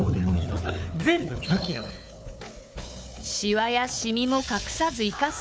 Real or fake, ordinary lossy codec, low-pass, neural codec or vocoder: fake; none; none; codec, 16 kHz, 4 kbps, FunCodec, trained on Chinese and English, 50 frames a second